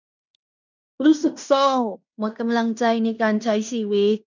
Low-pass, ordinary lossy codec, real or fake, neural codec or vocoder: 7.2 kHz; none; fake; codec, 16 kHz in and 24 kHz out, 0.9 kbps, LongCat-Audio-Codec, fine tuned four codebook decoder